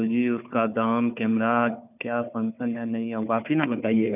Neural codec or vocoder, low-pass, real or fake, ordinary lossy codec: codec, 16 kHz, 4 kbps, FunCodec, trained on Chinese and English, 50 frames a second; 3.6 kHz; fake; none